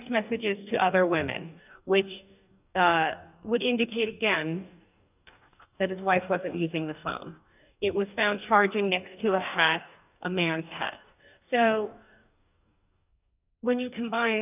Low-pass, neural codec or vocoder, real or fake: 3.6 kHz; codec, 44.1 kHz, 2.6 kbps, DAC; fake